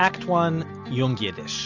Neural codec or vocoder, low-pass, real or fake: none; 7.2 kHz; real